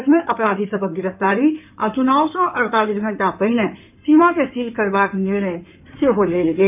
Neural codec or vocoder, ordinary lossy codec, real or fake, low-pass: codec, 16 kHz in and 24 kHz out, 2.2 kbps, FireRedTTS-2 codec; none; fake; 3.6 kHz